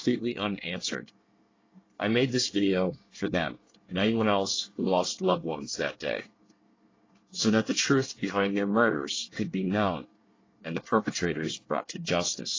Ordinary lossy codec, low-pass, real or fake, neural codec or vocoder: AAC, 32 kbps; 7.2 kHz; fake; codec, 24 kHz, 1 kbps, SNAC